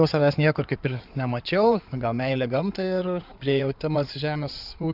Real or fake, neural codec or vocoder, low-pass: fake; codec, 16 kHz in and 24 kHz out, 2.2 kbps, FireRedTTS-2 codec; 5.4 kHz